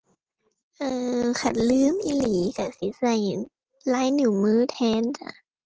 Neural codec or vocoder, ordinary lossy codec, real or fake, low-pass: none; Opus, 16 kbps; real; 7.2 kHz